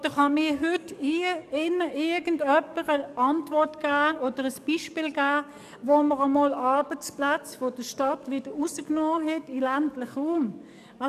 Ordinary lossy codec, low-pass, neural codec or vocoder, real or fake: none; 14.4 kHz; codec, 44.1 kHz, 7.8 kbps, DAC; fake